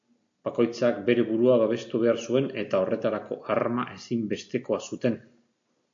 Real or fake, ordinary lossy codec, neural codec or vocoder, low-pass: real; AAC, 64 kbps; none; 7.2 kHz